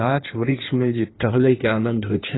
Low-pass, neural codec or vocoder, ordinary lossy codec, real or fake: 7.2 kHz; codec, 16 kHz in and 24 kHz out, 1.1 kbps, FireRedTTS-2 codec; AAC, 16 kbps; fake